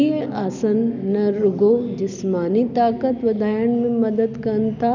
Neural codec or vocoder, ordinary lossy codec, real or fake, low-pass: none; none; real; 7.2 kHz